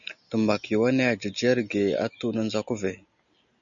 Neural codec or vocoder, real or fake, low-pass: none; real; 7.2 kHz